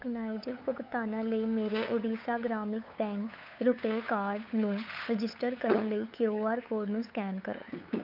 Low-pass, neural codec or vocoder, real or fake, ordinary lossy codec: 5.4 kHz; codec, 16 kHz, 8 kbps, FunCodec, trained on LibriTTS, 25 frames a second; fake; none